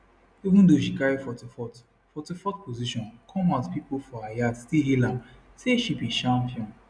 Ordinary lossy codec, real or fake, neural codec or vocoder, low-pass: none; real; none; 9.9 kHz